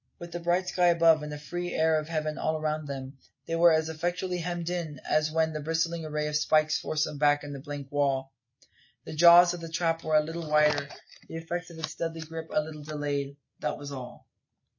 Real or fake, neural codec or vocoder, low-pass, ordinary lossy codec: real; none; 7.2 kHz; MP3, 32 kbps